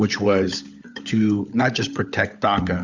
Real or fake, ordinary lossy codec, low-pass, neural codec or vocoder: fake; Opus, 64 kbps; 7.2 kHz; codec, 16 kHz, 16 kbps, FunCodec, trained on LibriTTS, 50 frames a second